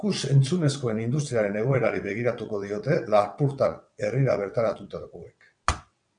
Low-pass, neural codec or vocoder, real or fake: 9.9 kHz; vocoder, 22.05 kHz, 80 mel bands, WaveNeXt; fake